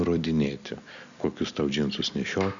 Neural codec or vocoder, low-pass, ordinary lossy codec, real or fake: none; 7.2 kHz; MP3, 96 kbps; real